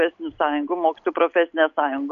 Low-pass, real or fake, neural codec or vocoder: 7.2 kHz; real; none